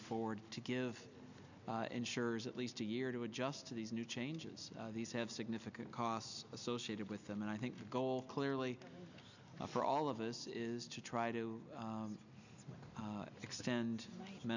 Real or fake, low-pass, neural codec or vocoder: real; 7.2 kHz; none